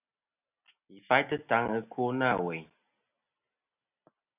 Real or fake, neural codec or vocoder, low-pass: real; none; 3.6 kHz